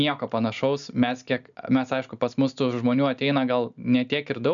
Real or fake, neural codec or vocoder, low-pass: real; none; 7.2 kHz